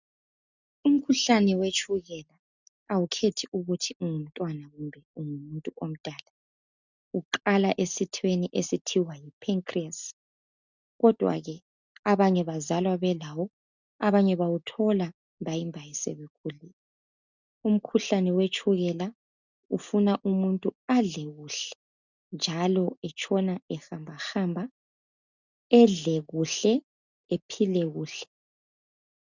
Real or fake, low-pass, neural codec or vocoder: real; 7.2 kHz; none